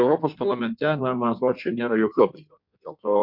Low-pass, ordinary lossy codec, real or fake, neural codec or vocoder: 5.4 kHz; MP3, 48 kbps; fake; codec, 16 kHz in and 24 kHz out, 1.1 kbps, FireRedTTS-2 codec